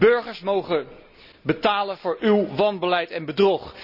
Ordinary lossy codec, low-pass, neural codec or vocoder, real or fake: none; 5.4 kHz; none; real